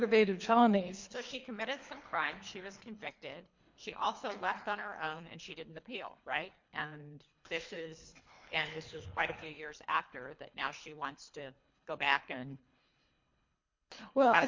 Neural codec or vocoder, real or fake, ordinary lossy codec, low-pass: codec, 24 kHz, 3 kbps, HILCodec; fake; MP3, 48 kbps; 7.2 kHz